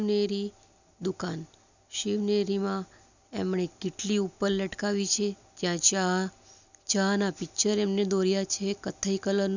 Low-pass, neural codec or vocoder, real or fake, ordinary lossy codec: 7.2 kHz; none; real; none